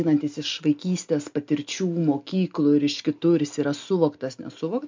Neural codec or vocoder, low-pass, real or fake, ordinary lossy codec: none; 7.2 kHz; real; MP3, 64 kbps